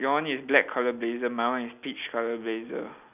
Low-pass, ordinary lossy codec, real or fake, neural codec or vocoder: 3.6 kHz; none; real; none